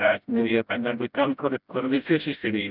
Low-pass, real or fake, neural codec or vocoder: 5.4 kHz; fake; codec, 16 kHz, 0.5 kbps, FreqCodec, smaller model